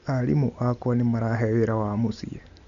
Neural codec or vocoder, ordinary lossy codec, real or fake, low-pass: none; MP3, 64 kbps; real; 7.2 kHz